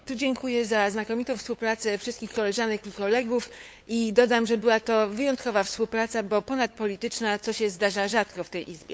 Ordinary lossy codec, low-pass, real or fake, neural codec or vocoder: none; none; fake; codec, 16 kHz, 8 kbps, FunCodec, trained on LibriTTS, 25 frames a second